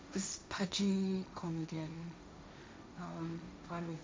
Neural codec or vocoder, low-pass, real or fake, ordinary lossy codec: codec, 16 kHz, 1.1 kbps, Voila-Tokenizer; none; fake; none